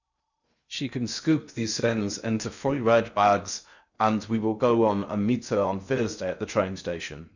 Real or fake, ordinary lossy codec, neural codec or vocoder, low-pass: fake; none; codec, 16 kHz in and 24 kHz out, 0.6 kbps, FocalCodec, streaming, 2048 codes; 7.2 kHz